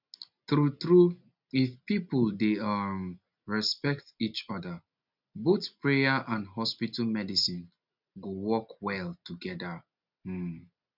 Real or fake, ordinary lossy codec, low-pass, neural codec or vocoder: real; none; 5.4 kHz; none